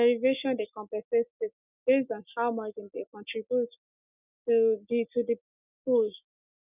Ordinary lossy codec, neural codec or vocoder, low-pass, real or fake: none; none; 3.6 kHz; real